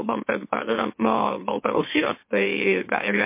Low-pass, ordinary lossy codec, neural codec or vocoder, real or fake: 3.6 kHz; MP3, 24 kbps; autoencoder, 44.1 kHz, a latent of 192 numbers a frame, MeloTTS; fake